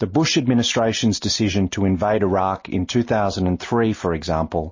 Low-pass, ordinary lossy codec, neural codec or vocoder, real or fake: 7.2 kHz; MP3, 32 kbps; none; real